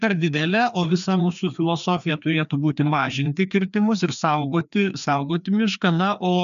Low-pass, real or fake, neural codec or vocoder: 7.2 kHz; fake; codec, 16 kHz, 2 kbps, FreqCodec, larger model